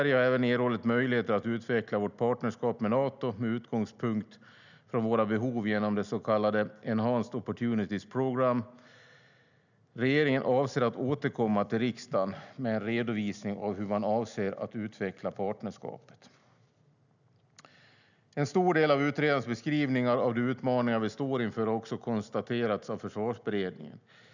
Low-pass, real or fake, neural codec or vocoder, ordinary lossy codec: 7.2 kHz; real; none; none